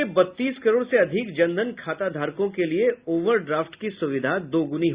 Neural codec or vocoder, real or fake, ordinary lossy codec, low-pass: none; real; Opus, 64 kbps; 3.6 kHz